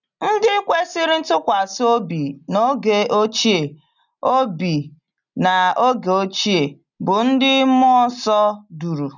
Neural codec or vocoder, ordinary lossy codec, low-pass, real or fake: none; none; 7.2 kHz; real